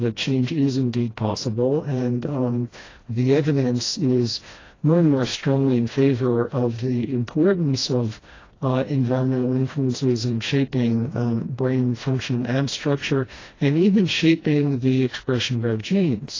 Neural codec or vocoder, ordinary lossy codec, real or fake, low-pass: codec, 16 kHz, 1 kbps, FreqCodec, smaller model; AAC, 32 kbps; fake; 7.2 kHz